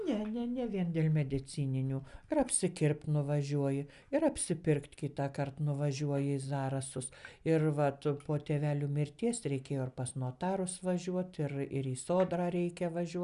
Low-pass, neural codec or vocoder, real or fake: 10.8 kHz; none; real